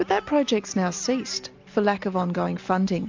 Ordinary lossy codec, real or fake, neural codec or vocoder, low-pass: MP3, 64 kbps; real; none; 7.2 kHz